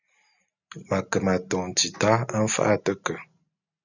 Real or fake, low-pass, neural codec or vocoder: real; 7.2 kHz; none